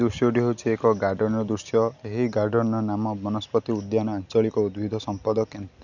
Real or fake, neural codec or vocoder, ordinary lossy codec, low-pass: real; none; none; 7.2 kHz